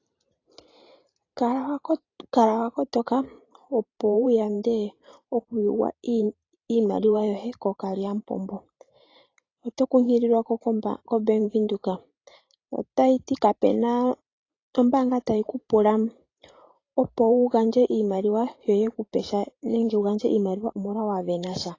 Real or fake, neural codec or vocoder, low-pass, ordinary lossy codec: fake; vocoder, 44.1 kHz, 128 mel bands every 512 samples, BigVGAN v2; 7.2 kHz; AAC, 32 kbps